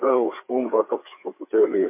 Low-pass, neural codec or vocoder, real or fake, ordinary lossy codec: 3.6 kHz; codec, 16 kHz, 4 kbps, FreqCodec, larger model; fake; MP3, 24 kbps